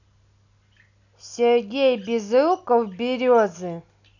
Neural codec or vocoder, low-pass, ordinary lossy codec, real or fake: none; 7.2 kHz; none; real